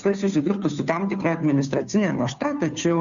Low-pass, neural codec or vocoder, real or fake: 7.2 kHz; codec, 16 kHz, 2 kbps, FunCodec, trained on Chinese and English, 25 frames a second; fake